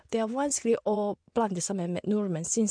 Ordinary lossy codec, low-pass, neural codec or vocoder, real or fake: MP3, 64 kbps; 9.9 kHz; vocoder, 24 kHz, 100 mel bands, Vocos; fake